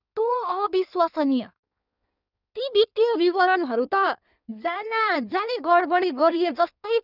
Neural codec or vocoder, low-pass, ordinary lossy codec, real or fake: codec, 16 kHz in and 24 kHz out, 1.1 kbps, FireRedTTS-2 codec; 5.4 kHz; none; fake